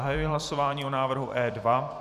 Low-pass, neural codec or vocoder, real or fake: 14.4 kHz; vocoder, 44.1 kHz, 128 mel bands every 256 samples, BigVGAN v2; fake